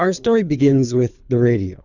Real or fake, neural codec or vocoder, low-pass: fake; codec, 24 kHz, 3 kbps, HILCodec; 7.2 kHz